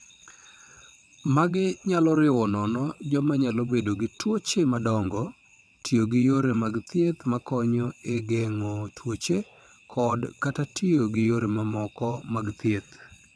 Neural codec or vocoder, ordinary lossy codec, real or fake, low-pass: vocoder, 22.05 kHz, 80 mel bands, WaveNeXt; none; fake; none